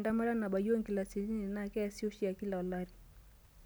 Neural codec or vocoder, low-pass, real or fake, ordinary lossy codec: none; none; real; none